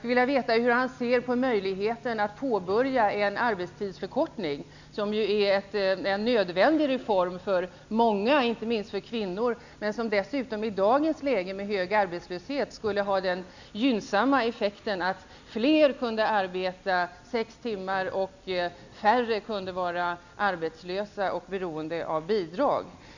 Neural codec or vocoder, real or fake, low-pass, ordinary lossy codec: none; real; 7.2 kHz; none